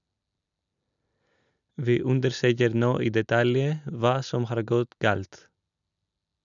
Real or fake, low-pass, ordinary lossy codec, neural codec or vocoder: real; 7.2 kHz; none; none